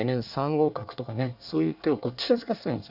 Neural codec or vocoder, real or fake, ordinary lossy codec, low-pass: codec, 24 kHz, 1 kbps, SNAC; fake; none; 5.4 kHz